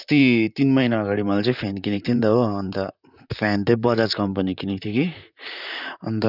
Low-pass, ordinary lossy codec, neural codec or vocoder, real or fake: 5.4 kHz; none; vocoder, 44.1 kHz, 128 mel bands, Pupu-Vocoder; fake